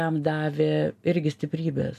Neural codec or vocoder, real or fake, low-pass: none; real; 14.4 kHz